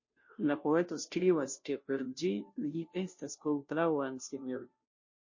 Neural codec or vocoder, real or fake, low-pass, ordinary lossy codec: codec, 16 kHz, 0.5 kbps, FunCodec, trained on Chinese and English, 25 frames a second; fake; 7.2 kHz; MP3, 32 kbps